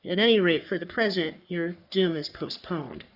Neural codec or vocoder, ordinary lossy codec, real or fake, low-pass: codec, 44.1 kHz, 3.4 kbps, Pupu-Codec; Opus, 64 kbps; fake; 5.4 kHz